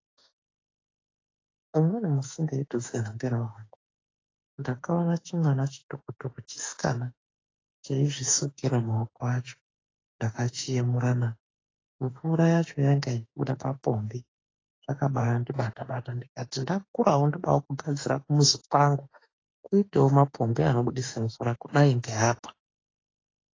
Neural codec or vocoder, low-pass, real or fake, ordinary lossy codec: autoencoder, 48 kHz, 32 numbers a frame, DAC-VAE, trained on Japanese speech; 7.2 kHz; fake; AAC, 32 kbps